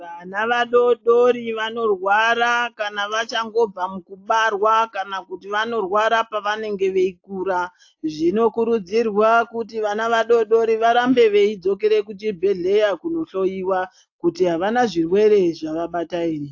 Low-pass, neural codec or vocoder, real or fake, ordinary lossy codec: 7.2 kHz; none; real; AAC, 48 kbps